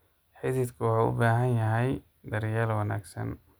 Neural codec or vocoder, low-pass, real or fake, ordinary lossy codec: none; none; real; none